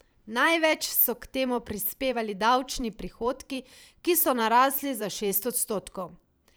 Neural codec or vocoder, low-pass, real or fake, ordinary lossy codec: vocoder, 44.1 kHz, 128 mel bands every 512 samples, BigVGAN v2; none; fake; none